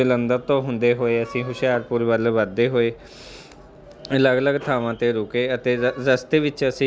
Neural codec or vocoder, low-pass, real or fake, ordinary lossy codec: none; none; real; none